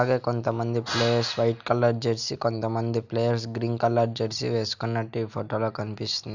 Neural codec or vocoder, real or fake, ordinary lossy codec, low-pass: none; real; none; 7.2 kHz